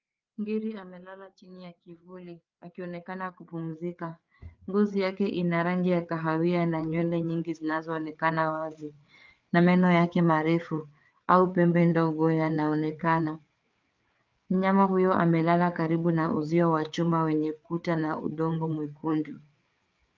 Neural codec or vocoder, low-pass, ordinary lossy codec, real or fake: codec, 16 kHz, 4 kbps, FreqCodec, larger model; 7.2 kHz; Opus, 24 kbps; fake